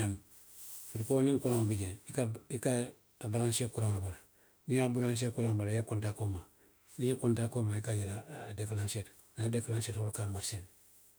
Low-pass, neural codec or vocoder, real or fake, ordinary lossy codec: none; autoencoder, 48 kHz, 32 numbers a frame, DAC-VAE, trained on Japanese speech; fake; none